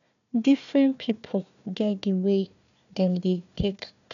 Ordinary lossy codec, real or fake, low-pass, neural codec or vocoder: none; fake; 7.2 kHz; codec, 16 kHz, 1 kbps, FunCodec, trained on Chinese and English, 50 frames a second